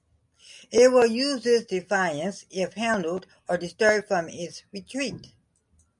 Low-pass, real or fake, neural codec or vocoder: 10.8 kHz; real; none